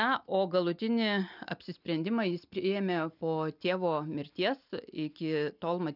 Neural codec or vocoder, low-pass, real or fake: none; 5.4 kHz; real